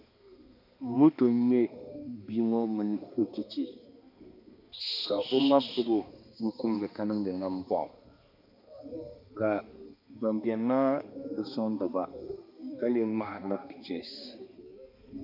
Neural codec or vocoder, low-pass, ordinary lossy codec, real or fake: codec, 16 kHz, 2 kbps, X-Codec, HuBERT features, trained on balanced general audio; 5.4 kHz; MP3, 48 kbps; fake